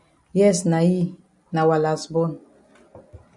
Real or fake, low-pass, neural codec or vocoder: real; 10.8 kHz; none